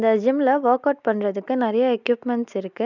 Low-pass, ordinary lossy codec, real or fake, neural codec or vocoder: 7.2 kHz; none; real; none